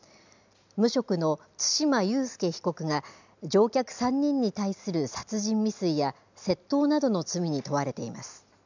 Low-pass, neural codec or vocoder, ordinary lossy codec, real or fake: 7.2 kHz; none; none; real